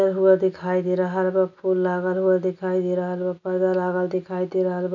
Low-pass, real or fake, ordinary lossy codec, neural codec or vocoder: 7.2 kHz; real; none; none